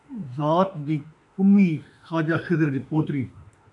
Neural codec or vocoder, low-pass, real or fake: autoencoder, 48 kHz, 32 numbers a frame, DAC-VAE, trained on Japanese speech; 10.8 kHz; fake